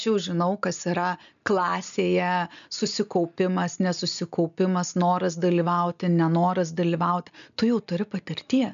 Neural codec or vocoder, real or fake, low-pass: none; real; 7.2 kHz